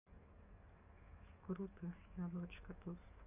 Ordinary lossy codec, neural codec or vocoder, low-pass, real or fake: none; codec, 44.1 kHz, 7.8 kbps, DAC; 3.6 kHz; fake